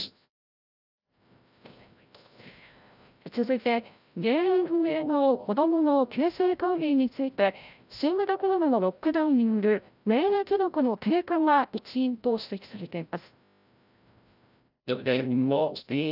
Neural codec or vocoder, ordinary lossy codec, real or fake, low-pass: codec, 16 kHz, 0.5 kbps, FreqCodec, larger model; none; fake; 5.4 kHz